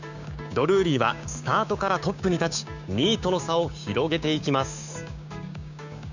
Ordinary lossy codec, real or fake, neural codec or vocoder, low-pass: none; fake; codec, 16 kHz, 6 kbps, DAC; 7.2 kHz